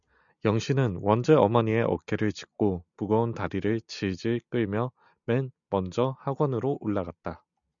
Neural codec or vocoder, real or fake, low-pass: none; real; 7.2 kHz